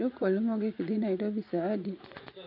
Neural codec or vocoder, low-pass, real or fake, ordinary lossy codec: vocoder, 24 kHz, 100 mel bands, Vocos; 5.4 kHz; fake; none